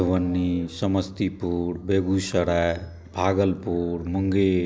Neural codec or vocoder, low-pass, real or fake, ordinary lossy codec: none; none; real; none